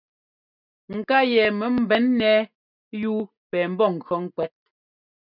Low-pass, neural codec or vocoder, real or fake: 5.4 kHz; none; real